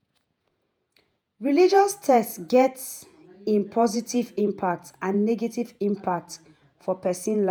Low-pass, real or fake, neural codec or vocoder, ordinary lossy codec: none; fake; vocoder, 48 kHz, 128 mel bands, Vocos; none